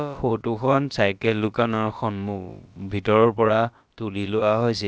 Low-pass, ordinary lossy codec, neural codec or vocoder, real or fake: none; none; codec, 16 kHz, about 1 kbps, DyCAST, with the encoder's durations; fake